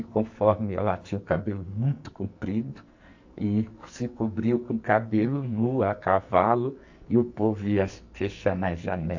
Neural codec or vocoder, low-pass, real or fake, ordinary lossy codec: codec, 44.1 kHz, 2.6 kbps, SNAC; 7.2 kHz; fake; AAC, 48 kbps